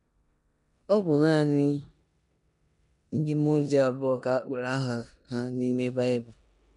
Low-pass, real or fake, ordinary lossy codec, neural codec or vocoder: 10.8 kHz; fake; none; codec, 16 kHz in and 24 kHz out, 0.9 kbps, LongCat-Audio-Codec, four codebook decoder